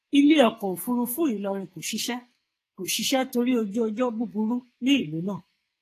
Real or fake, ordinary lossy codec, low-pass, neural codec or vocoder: fake; AAC, 48 kbps; 14.4 kHz; codec, 44.1 kHz, 2.6 kbps, SNAC